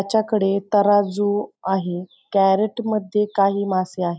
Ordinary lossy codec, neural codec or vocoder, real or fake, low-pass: none; none; real; none